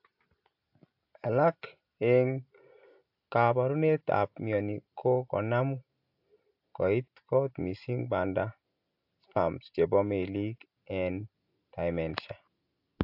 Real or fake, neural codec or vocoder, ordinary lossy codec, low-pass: real; none; AAC, 48 kbps; 5.4 kHz